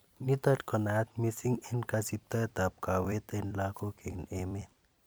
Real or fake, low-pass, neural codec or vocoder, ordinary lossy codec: fake; none; vocoder, 44.1 kHz, 128 mel bands every 256 samples, BigVGAN v2; none